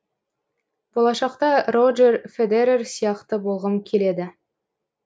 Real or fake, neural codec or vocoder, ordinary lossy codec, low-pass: real; none; none; none